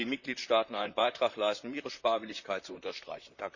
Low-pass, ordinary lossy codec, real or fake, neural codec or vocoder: 7.2 kHz; none; fake; vocoder, 44.1 kHz, 128 mel bands, Pupu-Vocoder